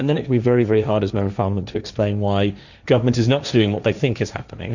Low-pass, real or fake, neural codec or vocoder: 7.2 kHz; fake; codec, 16 kHz, 1.1 kbps, Voila-Tokenizer